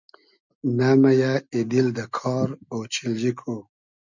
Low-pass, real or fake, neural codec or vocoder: 7.2 kHz; real; none